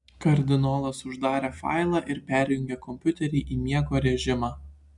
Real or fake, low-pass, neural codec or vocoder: real; 10.8 kHz; none